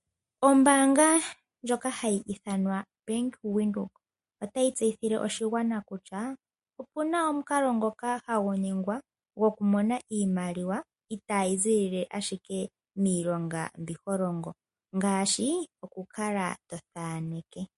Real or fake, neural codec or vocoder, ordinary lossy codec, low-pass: real; none; MP3, 48 kbps; 14.4 kHz